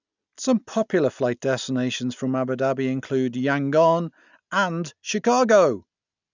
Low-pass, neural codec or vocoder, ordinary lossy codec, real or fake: 7.2 kHz; none; none; real